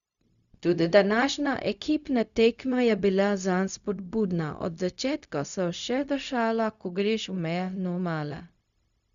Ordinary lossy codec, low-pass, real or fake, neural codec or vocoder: none; 7.2 kHz; fake; codec, 16 kHz, 0.4 kbps, LongCat-Audio-Codec